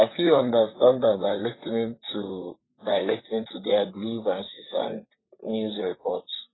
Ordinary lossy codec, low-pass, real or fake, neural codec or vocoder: AAC, 16 kbps; 7.2 kHz; fake; vocoder, 44.1 kHz, 128 mel bands, Pupu-Vocoder